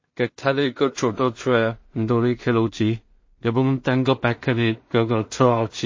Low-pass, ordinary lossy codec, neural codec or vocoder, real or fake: 7.2 kHz; MP3, 32 kbps; codec, 16 kHz in and 24 kHz out, 0.4 kbps, LongCat-Audio-Codec, two codebook decoder; fake